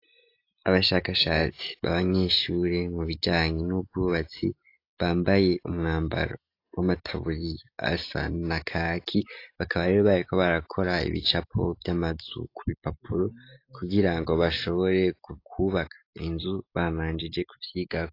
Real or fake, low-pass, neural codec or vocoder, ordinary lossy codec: real; 5.4 kHz; none; AAC, 32 kbps